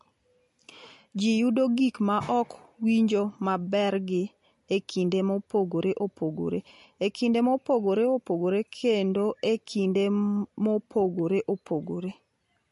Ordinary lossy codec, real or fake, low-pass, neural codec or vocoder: MP3, 48 kbps; real; 14.4 kHz; none